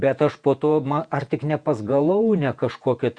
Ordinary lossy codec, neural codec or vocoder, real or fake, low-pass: Opus, 64 kbps; vocoder, 48 kHz, 128 mel bands, Vocos; fake; 9.9 kHz